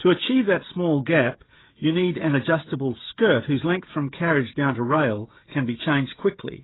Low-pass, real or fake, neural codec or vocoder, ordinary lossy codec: 7.2 kHz; fake; codec, 16 kHz, 16 kbps, FreqCodec, smaller model; AAC, 16 kbps